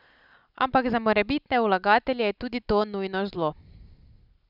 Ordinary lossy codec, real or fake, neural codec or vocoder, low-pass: none; real; none; 5.4 kHz